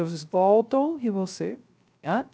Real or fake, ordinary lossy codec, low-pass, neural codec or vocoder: fake; none; none; codec, 16 kHz, 0.3 kbps, FocalCodec